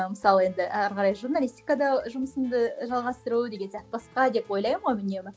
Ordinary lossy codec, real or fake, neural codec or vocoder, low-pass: none; real; none; none